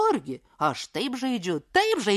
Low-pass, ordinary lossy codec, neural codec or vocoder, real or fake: 14.4 kHz; MP3, 64 kbps; none; real